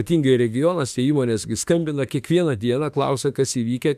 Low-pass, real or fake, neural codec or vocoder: 14.4 kHz; fake; autoencoder, 48 kHz, 32 numbers a frame, DAC-VAE, trained on Japanese speech